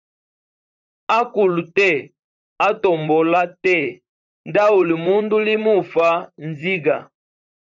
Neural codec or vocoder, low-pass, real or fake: vocoder, 44.1 kHz, 128 mel bands, Pupu-Vocoder; 7.2 kHz; fake